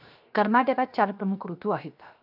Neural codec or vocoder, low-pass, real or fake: codec, 16 kHz, 0.7 kbps, FocalCodec; 5.4 kHz; fake